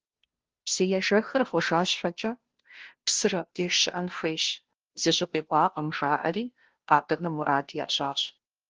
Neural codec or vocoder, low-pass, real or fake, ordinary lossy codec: codec, 16 kHz, 0.5 kbps, FunCodec, trained on Chinese and English, 25 frames a second; 7.2 kHz; fake; Opus, 16 kbps